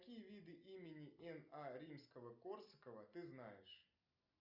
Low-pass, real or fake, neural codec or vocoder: 5.4 kHz; real; none